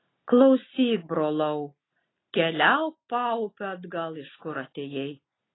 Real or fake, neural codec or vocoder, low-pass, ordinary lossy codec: real; none; 7.2 kHz; AAC, 16 kbps